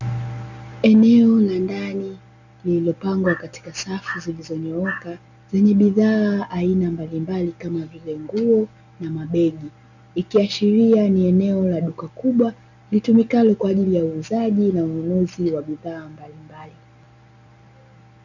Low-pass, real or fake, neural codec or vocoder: 7.2 kHz; real; none